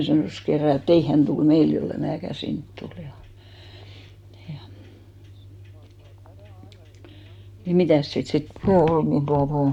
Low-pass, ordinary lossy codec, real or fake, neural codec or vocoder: 19.8 kHz; none; real; none